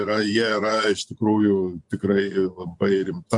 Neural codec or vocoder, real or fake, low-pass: vocoder, 24 kHz, 100 mel bands, Vocos; fake; 10.8 kHz